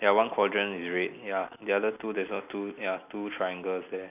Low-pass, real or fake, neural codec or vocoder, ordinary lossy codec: 3.6 kHz; real; none; none